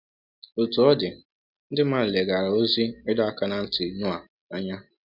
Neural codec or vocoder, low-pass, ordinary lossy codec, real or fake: none; 5.4 kHz; none; real